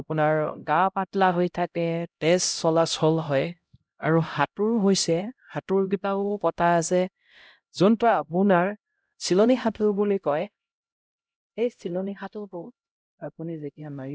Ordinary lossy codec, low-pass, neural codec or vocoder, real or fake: none; none; codec, 16 kHz, 0.5 kbps, X-Codec, HuBERT features, trained on LibriSpeech; fake